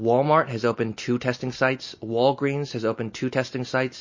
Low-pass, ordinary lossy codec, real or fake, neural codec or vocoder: 7.2 kHz; MP3, 32 kbps; real; none